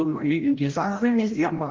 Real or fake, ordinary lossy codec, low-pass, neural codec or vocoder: fake; Opus, 16 kbps; 7.2 kHz; codec, 16 kHz, 0.5 kbps, FreqCodec, larger model